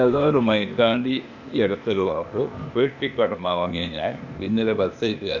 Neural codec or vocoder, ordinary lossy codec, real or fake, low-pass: codec, 16 kHz, 0.8 kbps, ZipCodec; none; fake; 7.2 kHz